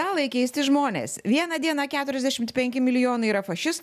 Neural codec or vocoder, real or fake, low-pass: none; real; 14.4 kHz